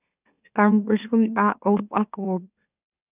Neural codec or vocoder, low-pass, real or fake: autoencoder, 44.1 kHz, a latent of 192 numbers a frame, MeloTTS; 3.6 kHz; fake